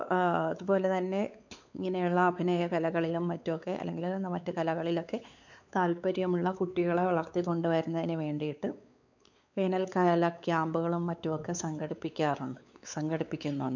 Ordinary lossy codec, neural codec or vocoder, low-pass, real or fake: none; codec, 16 kHz, 4 kbps, X-Codec, WavLM features, trained on Multilingual LibriSpeech; 7.2 kHz; fake